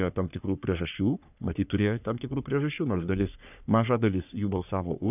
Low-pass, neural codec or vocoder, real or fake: 3.6 kHz; codec, 44.1 kHz, 3.4 kbps, Pupu-Codec; fake